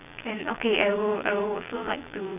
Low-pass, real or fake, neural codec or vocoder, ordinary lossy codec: 3.6 kHz; fake; vocoder, 22.05 kHz, 80 mel bands, Vocos; none